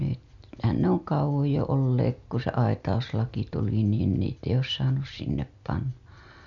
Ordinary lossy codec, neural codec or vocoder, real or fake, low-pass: none; none; real; 7.2 kHz